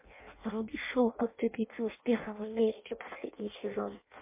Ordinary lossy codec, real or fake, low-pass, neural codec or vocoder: MP3, 24 kbps; fake; 3.6 kHz; codec, 16 kHz in and 24 kHz out, 0.6 kbps, FireRedTTS-2 codec